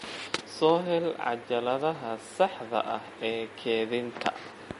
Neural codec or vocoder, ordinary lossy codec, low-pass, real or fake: none; MP3, 48 kbps; 10.8 kHz; real